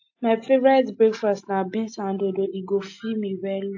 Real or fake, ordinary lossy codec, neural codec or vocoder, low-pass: real; AAC, 48 kbps; none; 7.2 kHz